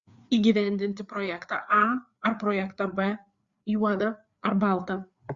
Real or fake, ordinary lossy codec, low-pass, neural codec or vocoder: fake; Opus, 64 kbps; 7.2 kHz; codec, 16 kHz, 4 kbps, FreqCodec, larger model